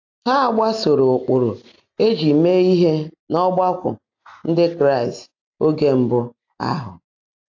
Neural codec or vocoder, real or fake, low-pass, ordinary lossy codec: none; real; 7.2 kHz; AAC, 48 kbps